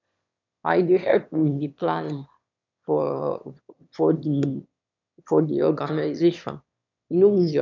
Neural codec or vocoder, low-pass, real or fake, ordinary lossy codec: autoencoder, 22.05 kHz, a latent of 192 numbers a frame, VITS, trained on one speaker; 7.2 kHz; fake; none